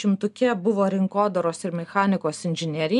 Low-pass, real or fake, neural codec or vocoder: 10.8 kHz; real; none